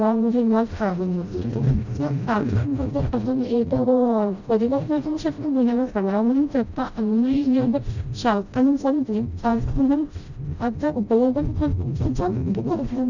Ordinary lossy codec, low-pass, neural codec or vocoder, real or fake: none; 7.2 kHz; codec, 16 kHz, 0.5 kbps, FreqCodec, smaller model; fake